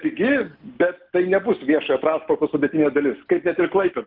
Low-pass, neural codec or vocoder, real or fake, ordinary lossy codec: 5.4 kHz; none; real; Opus, 24 kbps